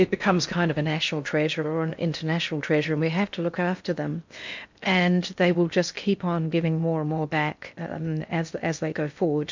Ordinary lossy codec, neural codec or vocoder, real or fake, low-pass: MP3, 64 kbps; codec, 16 kHz in and 24 kHz out, 0.6 kbps, FocalCodec, streaming, 4096 codes; fake; 7.2 kHz